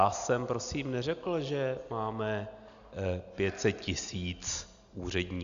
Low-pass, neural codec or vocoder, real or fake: 7.2 kHz; none; real